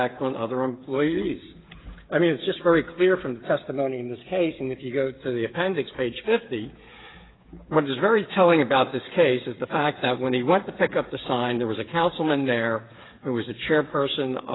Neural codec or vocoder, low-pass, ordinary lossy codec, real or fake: codec, 16 kHz, 8 kbps, FreqCodec, smaller model; 7.2 kHz; AAC, 16 kbps; fake